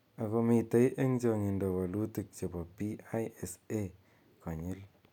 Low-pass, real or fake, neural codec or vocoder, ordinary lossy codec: 19.8 kHz; real; none; none